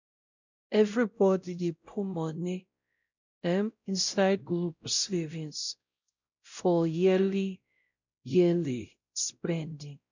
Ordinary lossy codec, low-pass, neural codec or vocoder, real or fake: none; 7.2 kHz; codec, 16 kHz, 0.5 kbps, X-Codec, WavLM features, trained on Multilingual LibriSpeech; fake